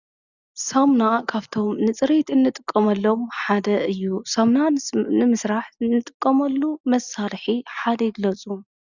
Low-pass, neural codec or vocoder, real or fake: 7.2 kHz; none; real